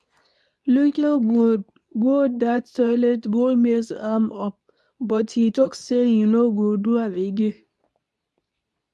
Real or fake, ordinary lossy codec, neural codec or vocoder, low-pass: fake; none; codec, 24 kHz, 0.9 kbps, WavTokenizer, medium speech release version 2; none